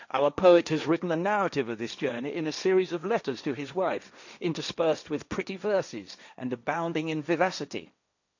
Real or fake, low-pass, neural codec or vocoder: fake; 7.2 kHz; codec, 16 kHz, 1.1 kbps, Voila-Tokenizer